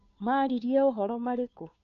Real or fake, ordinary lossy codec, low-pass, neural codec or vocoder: fake; AAC, 96 kbps; 7.2 kHz; codec, 16 kHz, 2 kbps, FunCodec, trained on Chinese and English, 25 frames a second